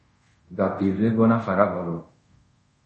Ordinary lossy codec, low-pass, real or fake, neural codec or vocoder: MP3, 32 kbps; 10.8 kHz; fake; codec, 24 kHz, 0.5 kbps, DualCodec